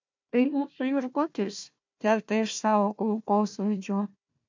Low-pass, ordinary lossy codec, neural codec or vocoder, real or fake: 7.2 kHz; MP3, 64 kbps; codec, 16 kHz, 1 kbps, FunCodec, trained on Chinese and English, 50 frames a second; fake